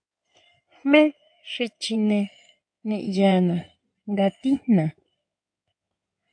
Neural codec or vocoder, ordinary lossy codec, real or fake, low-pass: codec, 16 kHz in and 24 kHz out, 2.2 kbps, FireRedTTS-2 codec; AAC, 64 kbps; fake; 9.9 kHz